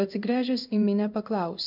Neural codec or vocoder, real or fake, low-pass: codec, 16 kHz in and 24 kHz out, 1 kbps, XY-Tokenizer; fake; 5.4 kHz